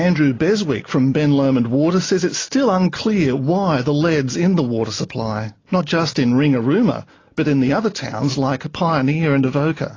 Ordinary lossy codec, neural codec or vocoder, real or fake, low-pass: AAC, 32 kbps; none; real; 7.2 kHz